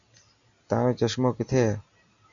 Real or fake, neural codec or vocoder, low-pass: real; none; 7.2 kHz